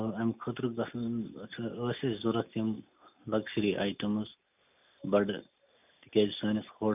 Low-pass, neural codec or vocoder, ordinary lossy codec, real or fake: 3.6 kHz; none; none; real